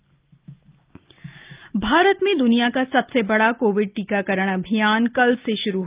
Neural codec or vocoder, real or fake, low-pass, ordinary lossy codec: none; real; 3.6 kHz; Opus, 32 kbps